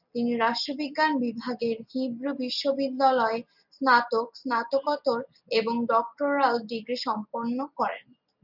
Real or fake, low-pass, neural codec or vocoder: real; 5.4 kHz; none